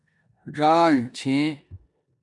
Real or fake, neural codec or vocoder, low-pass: fake; codec, 16 kHz in and 24 kHz out, 0.9 kbps, LongCat-Audio-Codec, four codebook decoder; 10.8 kHz